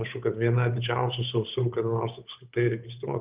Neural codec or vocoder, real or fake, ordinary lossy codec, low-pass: codec, 16 kHz, 8 kbps, FunCodec, trained on Chinese and English, 25 frames a second; fake; Opus, 24 kbps; 3.6 kHz